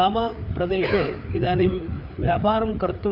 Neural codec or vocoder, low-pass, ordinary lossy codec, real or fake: codec, 16 kHz, 4 kbps, FreqCodec, larger model; 5.4 kHz; none; fake